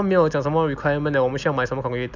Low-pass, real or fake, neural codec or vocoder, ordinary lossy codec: 7.2 kHz; real; none; none